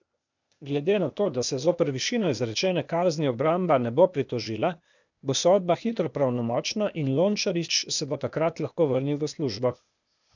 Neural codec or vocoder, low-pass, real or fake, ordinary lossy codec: codec, 16 kHz, 0.8 kbps, ZipCodec; 7.2 kHz; fake; none